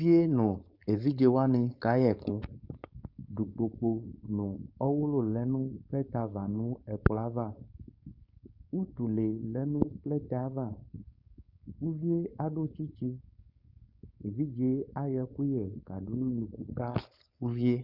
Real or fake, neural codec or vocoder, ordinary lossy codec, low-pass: fake; codec, 16 kHz, 4.8 kbps, FACodec; Opus, 64 kbps; 5.4 kHz